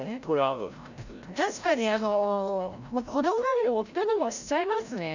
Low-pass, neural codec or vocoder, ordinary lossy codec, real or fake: 7.2 kHz; codec, 16 kHz, 0.5 kbps, FreqCodec, larger model; none; fake